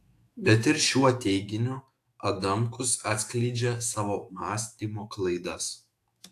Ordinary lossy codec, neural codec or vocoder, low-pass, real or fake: AAC, 64 kbps; autoencoder, 48 kHz, 128 numbers a frame, DAC-VAE, trained on Japanese speech; 14.4 kHz; fake